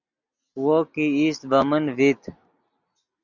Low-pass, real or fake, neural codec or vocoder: 7.2 kHz; real; none